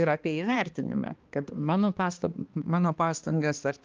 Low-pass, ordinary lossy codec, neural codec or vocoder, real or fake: 7.2 kHz; Opus, 24 kbps; codec, 16 kHz, 2 kbps, X-Codec, HuBERT features, trained on balanced general audio; fake